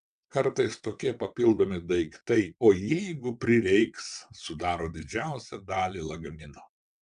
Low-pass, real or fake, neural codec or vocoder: 9.9 kHz; fake; vocoder, 22.05 kHz, 80 mel bands, WaveNeXt